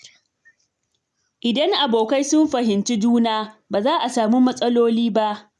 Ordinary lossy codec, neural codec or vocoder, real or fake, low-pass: none; none; real; none